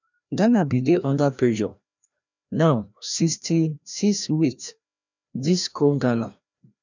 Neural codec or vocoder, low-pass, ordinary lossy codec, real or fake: codec, 16 kHz, 1 kbps, FreqCodec, larger model; 7.2 kHz; none; fake